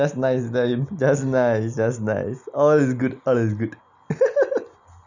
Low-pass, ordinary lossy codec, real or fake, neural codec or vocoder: 7.2 kHz; none; real; none